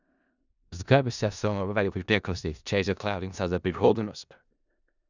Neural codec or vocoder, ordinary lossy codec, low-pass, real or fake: codec, 16 kHz in and 24 kHz out, 0.4 kbps, LongCat-Audio-Codec, four codebook decoder; none; 7.2 kHz; fake